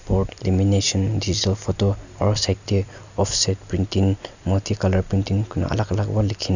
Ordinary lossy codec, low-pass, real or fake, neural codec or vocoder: none; 7.2 kHz; real; none